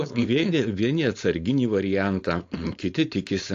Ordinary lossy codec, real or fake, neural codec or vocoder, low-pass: AAC, 48 kbps; fake; codec, 16 kHz, 4.8 kbps, FACodec; 7.2 kHz